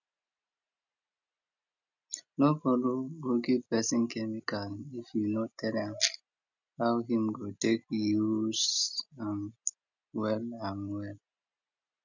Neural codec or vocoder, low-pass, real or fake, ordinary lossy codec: none; 7.2 kHz; real; none